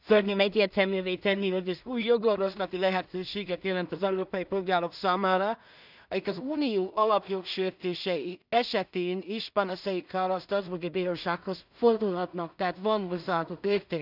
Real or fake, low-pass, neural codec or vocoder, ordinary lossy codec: fake; 5.4 kHz; codec, 16 kHz in and 24 kHz out, 0.4 kbps, LongCat-Audio-Codec, two codebook decoder; none